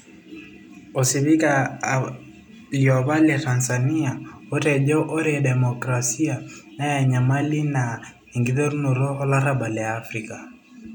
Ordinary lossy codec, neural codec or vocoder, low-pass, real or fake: none; none; 19.8 kHz; real